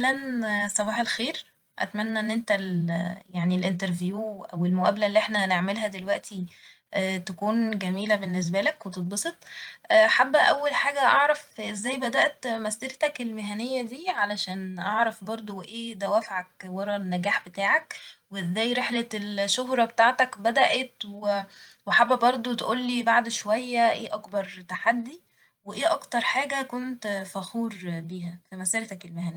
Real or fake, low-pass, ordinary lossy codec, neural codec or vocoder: fake; 19.8 kHz; Opus, 32 kbps; vocoder, 44.1 kHz, 128 mel bands every 512 samples, BigVGAN v2